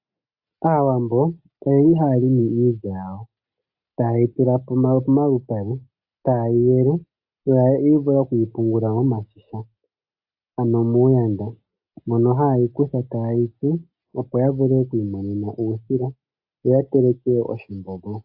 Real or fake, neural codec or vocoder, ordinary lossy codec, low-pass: real; none; AAC, 48 kbps; 5.4 kHz